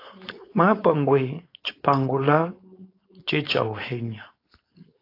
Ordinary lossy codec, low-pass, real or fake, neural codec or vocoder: AAC, 32 kbps; 5.4 kHz; fake; codec, 16 kHz, 4.8 kbps, FACodec